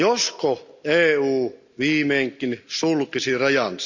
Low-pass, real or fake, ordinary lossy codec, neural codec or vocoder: 7.2 kHz; real; none; none